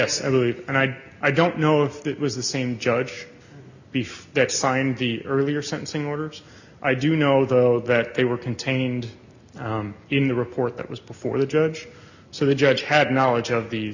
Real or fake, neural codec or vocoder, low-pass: real; none; 7.2 kHz